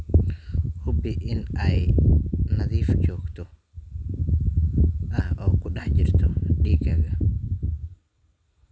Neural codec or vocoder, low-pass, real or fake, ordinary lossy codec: none; none; real; none